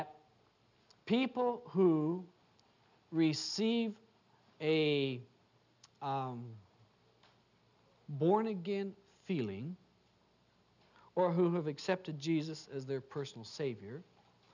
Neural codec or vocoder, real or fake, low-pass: none; real; 7.2 kHz